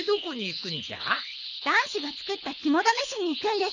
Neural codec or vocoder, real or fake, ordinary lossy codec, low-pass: codec, 24 kHz, 6 kbps, HILCodec; fake; none; 7.2 kHz